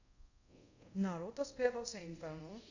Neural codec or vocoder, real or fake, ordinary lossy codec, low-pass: codec, 24 kHz, 0.5 kbps, DualCodec; fake; none; 7.2 kHz